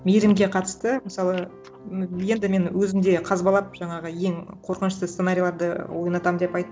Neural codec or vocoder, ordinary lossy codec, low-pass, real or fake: none; none; none; real